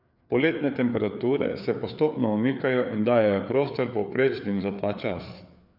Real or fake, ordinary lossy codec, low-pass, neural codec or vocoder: fake; none; 5.4 kHz; codec, 16 kHz, 4 kbps, FreqCodec, larger model